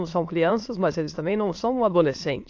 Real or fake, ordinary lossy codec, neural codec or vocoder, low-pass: fake; AAC, 48 kbps; autoencoder, 22.05 kHz, a latent of 192 numbers a frame, VITS, trained on many speakers; 7.2 kHz